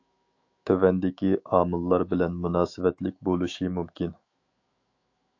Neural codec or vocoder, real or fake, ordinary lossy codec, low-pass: autoencoder, 48 kHz, 128 numbers a frame, DAC-VAE, trained on Japanese speech; fake; AAC, 48 kbps; 7.2 kHz